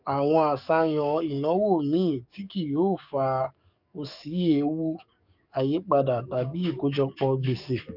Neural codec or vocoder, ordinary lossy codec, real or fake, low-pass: codec, 44.1 kHz, 7.8 kbps, DAC; none; fake; 5.4 kHz